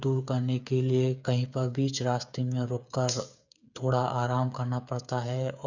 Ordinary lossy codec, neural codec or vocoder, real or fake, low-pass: none; codec, 16 kHz, 8 kbps, FreqCodec, smaller model; fake; 7.2 kHz